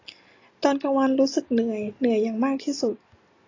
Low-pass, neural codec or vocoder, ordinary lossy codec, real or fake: 7.2 kHz; none; AAC, 32 kbps; real